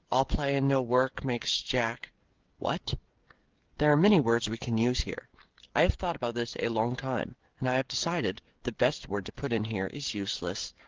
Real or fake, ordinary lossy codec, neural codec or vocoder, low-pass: fake; Opus, 16 kbps; codec, 16 kHz, 16 kbps, FreqCodec, smaller model; 7.2 kHz